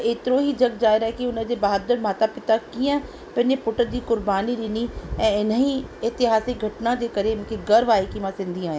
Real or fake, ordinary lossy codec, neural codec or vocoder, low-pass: real; none; none; none